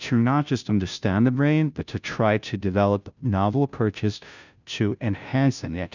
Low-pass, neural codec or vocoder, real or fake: 7.2 kHz; codec, 16 kHz, 0.5 kbps, FunCodec, trained on Chinese and English, 25 frames a second; fake